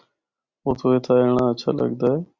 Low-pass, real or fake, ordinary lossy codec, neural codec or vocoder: 7.2 kHz; real; Opus, 64 kbps; none